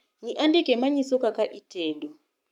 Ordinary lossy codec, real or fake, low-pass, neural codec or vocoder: none; fake; 19.8 kHz; codec, 44.1 kHz, 7.8 kbps, Pupu-Codec